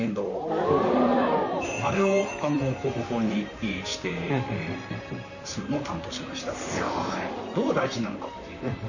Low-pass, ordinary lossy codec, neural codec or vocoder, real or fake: 7.2 kHz; none; vocoder, 44.1 kHz, 128 mel bands, Pupu-Vocoder; fake